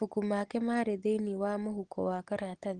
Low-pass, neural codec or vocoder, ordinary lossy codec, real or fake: 9.9 kHz; none; Opus, 16 kbps; real